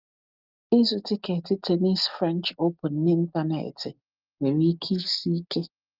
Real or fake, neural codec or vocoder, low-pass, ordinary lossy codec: real; none; 5.4 kHz; Opus, 32 kbps